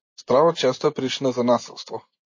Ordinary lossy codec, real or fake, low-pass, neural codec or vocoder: MP3, 32 kbps; fake; 7.2 kHz; vocoder, 24 kHz, 100 mel bands, Vocos